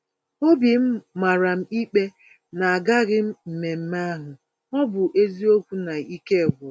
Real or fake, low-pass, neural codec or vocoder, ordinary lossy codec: real; none; none; none